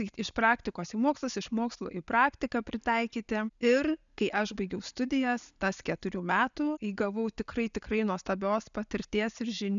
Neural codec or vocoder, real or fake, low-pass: none; real; 7.2 kHz